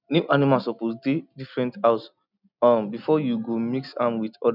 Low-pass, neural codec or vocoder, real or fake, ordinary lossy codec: 5.4 kHz; none; real; none